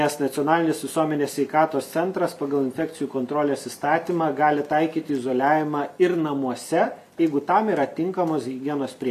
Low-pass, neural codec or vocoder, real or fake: 14.4 kHz; vocoder, 44.1 kHz, 128 mel bands every 256 samples, BigVGAN v2; fake